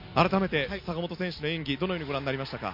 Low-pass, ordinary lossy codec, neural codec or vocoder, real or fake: 5.4 kHz; MP3, 32 kbps; none; real